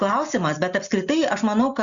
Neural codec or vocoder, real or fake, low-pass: none; real; 7.2 kHz